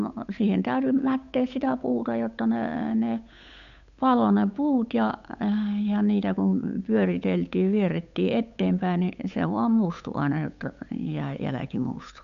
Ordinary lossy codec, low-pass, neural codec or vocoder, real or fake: none; 7.2 kHz; codec, 16 kHz, 8 kbps, FunCodec, trained on Chinese and English, 25 frames a second; fake